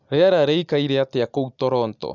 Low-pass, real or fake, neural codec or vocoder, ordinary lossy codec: 7.2 kHz; real; none; none